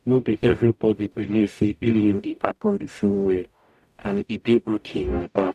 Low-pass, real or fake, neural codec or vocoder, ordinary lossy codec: 14.4 kHz; fake; codec, 44.1 kHz, 0.9 kbps, DAC; none